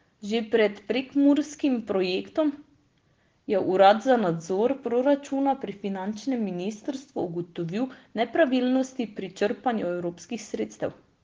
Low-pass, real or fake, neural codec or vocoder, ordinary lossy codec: 7.2 kHz; real; none; Opus, 16 kbps